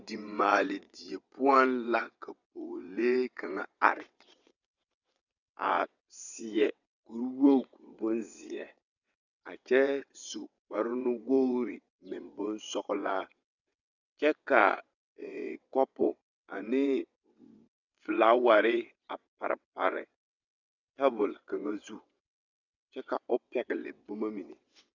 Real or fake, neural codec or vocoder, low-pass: fake; vocoder, 22.05 kHz, 80 mel bands, WaveNeXt; 7.2 kHz